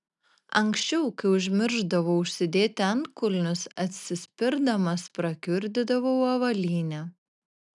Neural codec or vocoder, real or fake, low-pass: none; real; 10.8 kHz